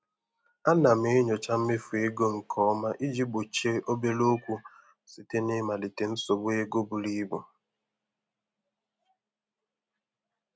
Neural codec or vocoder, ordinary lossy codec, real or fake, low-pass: none; none; real; none